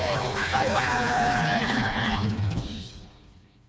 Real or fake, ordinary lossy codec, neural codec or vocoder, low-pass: fake; none; codec, 16 kHz, 2 kbps, FreqCodec, smaller model; none